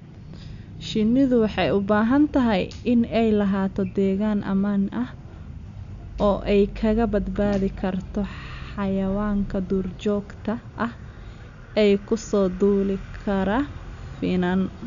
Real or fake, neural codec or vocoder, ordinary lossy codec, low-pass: real; none; none; 7.2 kHz